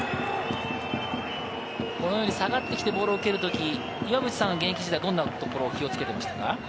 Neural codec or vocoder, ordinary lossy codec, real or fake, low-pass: none; none; real; none